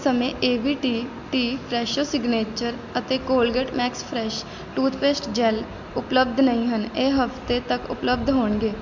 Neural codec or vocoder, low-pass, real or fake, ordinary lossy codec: none; 7.2 kHz; real; none